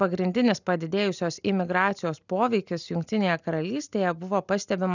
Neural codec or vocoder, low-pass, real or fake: none; 7.2 kHz; real